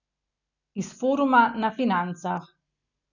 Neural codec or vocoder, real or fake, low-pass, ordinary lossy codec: none; real; 7.2 kHz; none